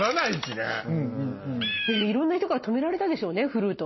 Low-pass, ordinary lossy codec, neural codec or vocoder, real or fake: 7.2 kHz; MP3, 24 kbps; none; real